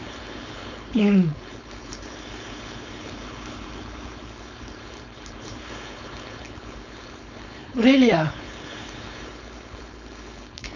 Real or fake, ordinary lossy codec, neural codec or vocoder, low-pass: fake; none; codec, 16 kHz, 4.8 kbps, FACodec; 7.2 kHz